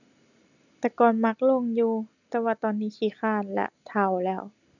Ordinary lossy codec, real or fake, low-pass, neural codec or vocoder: none; real; 7.2 kHz; none